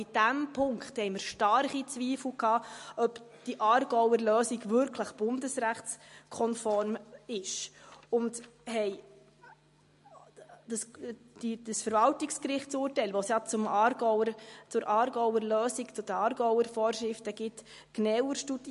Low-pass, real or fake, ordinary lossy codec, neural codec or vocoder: 14.4 kHz; real; MP3, 48 kbps; none